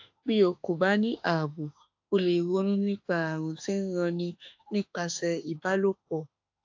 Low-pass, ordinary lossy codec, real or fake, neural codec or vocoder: 7.2 kHz; AAC, 48 kbps; fake; autoencoder, 48 kHz, 32 numbers a frame, DAC-VAE, trained on Japanese speech